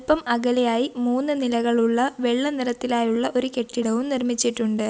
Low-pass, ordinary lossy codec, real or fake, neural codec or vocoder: none; none; real; none